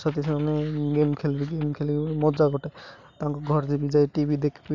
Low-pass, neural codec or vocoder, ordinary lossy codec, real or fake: 7.2 kHz; none; none; real